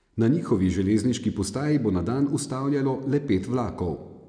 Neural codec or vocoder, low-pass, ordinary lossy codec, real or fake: none; 9.9 kHz; none; real